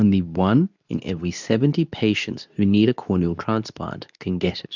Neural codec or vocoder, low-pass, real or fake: codec, 24 kHz, 0.9 kbps, WavTokenizer, medium speech release version 2; 7.2 kHz; fake